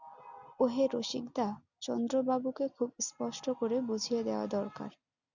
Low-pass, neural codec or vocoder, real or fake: 7.2 kHz; none; real